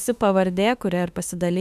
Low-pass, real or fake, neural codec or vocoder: 14.4 kHz; fake; autoencoder, 48 kHz, 32 numbers a frame, DAC-VAE, trained on Japanese speech